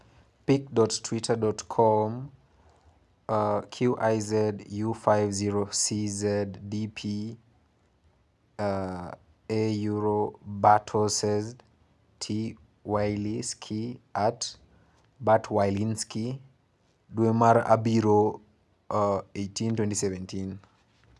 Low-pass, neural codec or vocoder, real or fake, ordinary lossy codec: none; none; real; none